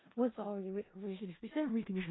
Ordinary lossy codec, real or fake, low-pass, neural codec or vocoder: AAC, 16 kbps; fake; 7.2 kHz; codec, 16 kHz in and 24 kHz out, 0.4 kbps, LongCat-Audio-Codec, four codebook decoder